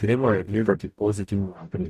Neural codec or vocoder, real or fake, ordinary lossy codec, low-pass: codec, 44.1 kHz, 0.9 kbps, DAC; fake; none; 14.4 kHz